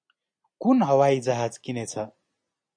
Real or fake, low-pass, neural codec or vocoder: real; 9.9 kHz; none